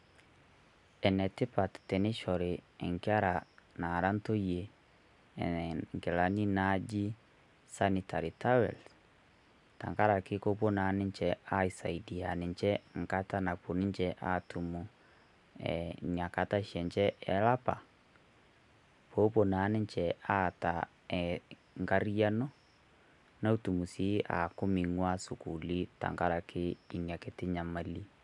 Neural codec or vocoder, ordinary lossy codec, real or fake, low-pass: none; none; real; 10.8 kHz